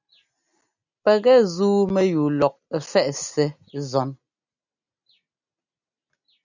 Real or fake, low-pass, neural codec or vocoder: real; 7.2 kHz; none